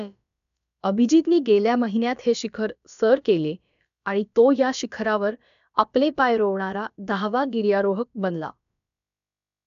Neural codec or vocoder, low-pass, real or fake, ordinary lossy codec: codec, 16 kHz, about 1 kbps, DyCAST, with the encoder's durations; 7.2 kHz; fake; MP3, 96 kbps